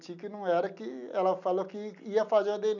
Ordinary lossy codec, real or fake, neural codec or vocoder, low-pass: none; real; none; 7.2 kHz